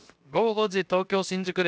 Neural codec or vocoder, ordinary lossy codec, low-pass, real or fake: codec, 16 kHz, 0.7 kbps, FocalCodec; none; none; fake